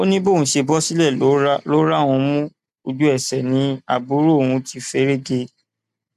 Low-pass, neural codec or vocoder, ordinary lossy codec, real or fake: 14.4 kHz; none; AAC, 96 kbps; real